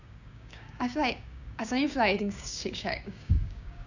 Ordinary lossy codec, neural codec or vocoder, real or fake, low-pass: none; codec, 16 kHz, 6 kbps, DAC; fake; 7.2 kHz